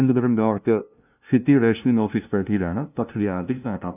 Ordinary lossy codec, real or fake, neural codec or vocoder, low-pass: none; fake; codec, 16 kHz, 0.5 kbps, FunCodec, trained on LibriTTS, 25 frames a second; 3.6 kHz